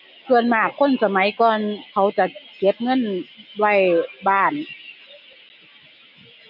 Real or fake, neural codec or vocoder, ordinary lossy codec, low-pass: real; none; none; 5.4 kHz